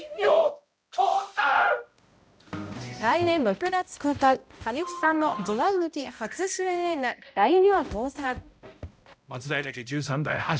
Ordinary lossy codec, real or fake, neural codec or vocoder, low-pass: none; fake; codec, 16 kHz, 0.5 kbps, X-Codec, HuBERT features, trained on balanced general audio; none